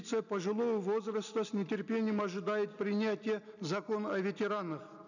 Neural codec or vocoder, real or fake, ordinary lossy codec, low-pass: none; real; none; 7.2 kHz